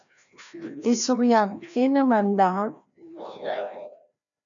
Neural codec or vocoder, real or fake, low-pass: codec, 16 kHz, 1 kbps, FreqCodec, larger model; fake; 7.2 kHz